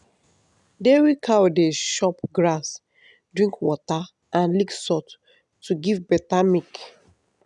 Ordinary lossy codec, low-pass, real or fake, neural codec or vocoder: none; 10.8 kHz; real; none